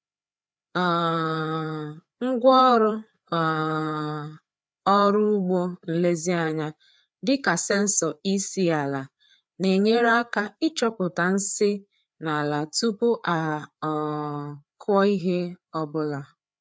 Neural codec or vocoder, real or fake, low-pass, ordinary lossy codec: codec, 16 kHz, 4 kbps, FreqCodec, larger model; fake; none; none